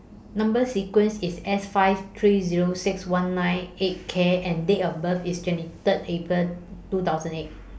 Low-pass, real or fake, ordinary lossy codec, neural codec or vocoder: none; real; none; none